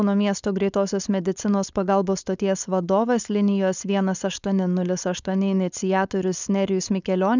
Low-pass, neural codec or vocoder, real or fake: 7.2 kHz; codec, 16 kHz, 4.8 kbps, FACodec; fake